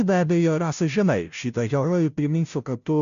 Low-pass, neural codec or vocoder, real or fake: 7.2 kHz; codec, 16 kHz, 0.5 kbps, FunCodec, trained on Chinese and English, 25 frames a second; fake